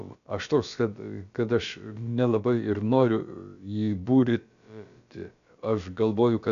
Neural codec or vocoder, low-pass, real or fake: codec, 16 kHz, about 1 kbps, DyCAST, with the encoder's durations; 7.2 kHz; fake